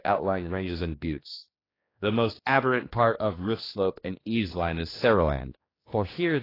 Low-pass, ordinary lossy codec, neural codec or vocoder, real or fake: 5.4 kHz; AAC, 24 kbps; codec, 16 kHz, 2 kbps, X-Codec, HuBERT features, trained on general audio; fake